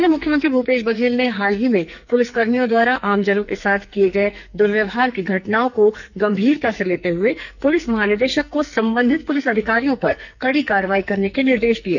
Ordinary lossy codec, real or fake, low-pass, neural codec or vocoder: none; fake; 7.2 kHz; codec, 44.1 kHz, 2.6 kbps, SNAC